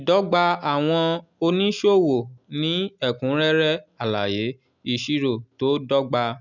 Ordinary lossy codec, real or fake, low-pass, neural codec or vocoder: none; real; 7.2 kHz; none